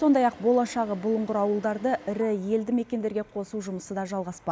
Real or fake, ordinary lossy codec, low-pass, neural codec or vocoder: real; none; none; none